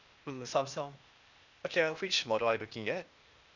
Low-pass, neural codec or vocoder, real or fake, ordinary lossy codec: 7.2 kHz; codec, 16 kHz, 0.8 kbps, ZipCodec; fake; none